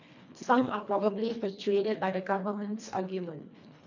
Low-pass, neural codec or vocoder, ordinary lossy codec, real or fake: 7.2 kHz; codec, 24 kHz, 1.5 kbps, HILCodec; none; fake